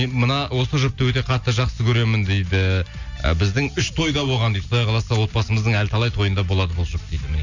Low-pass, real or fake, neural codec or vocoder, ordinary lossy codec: 7.2 kHz; real; none; none